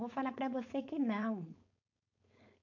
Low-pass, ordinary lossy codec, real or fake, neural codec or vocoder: 7.2 kHz; none; fake; codec, 16 kHz, 4.8 kbps, FACodec